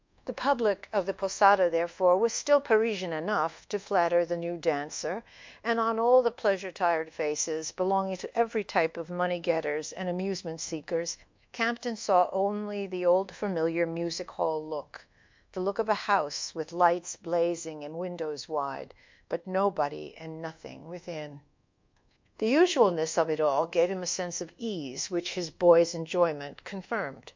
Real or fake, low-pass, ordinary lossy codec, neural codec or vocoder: fake; 7.2 kHz; MP3, 64 kbps; codec, 24 kHz, 1.2 kbps, DualCodec